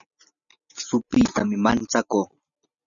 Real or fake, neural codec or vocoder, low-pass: real; none; 7.2 kHz